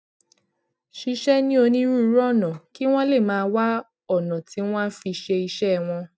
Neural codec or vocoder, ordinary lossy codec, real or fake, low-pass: none; none; real; none